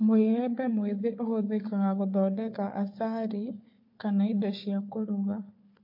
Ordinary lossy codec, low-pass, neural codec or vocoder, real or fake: MP3, 32 kbps; 5.4 kHz; codec, 16 kHz, 4 kbps, FunCodec, trained on Chinese and English, 50 frames a second; fake